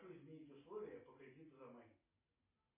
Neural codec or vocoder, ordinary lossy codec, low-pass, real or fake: none; MP3, 16 kbps; 3.6 kHz; real